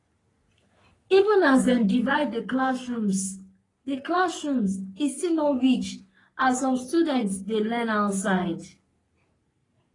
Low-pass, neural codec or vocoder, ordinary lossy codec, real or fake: 10.8 kHz; codec, 44.1 kHz, 3.4 kbps, Pupu-Codec; AAC, 32 kbps; fake